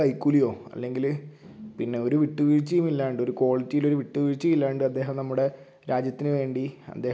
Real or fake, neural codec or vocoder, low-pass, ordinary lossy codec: real; none; none; none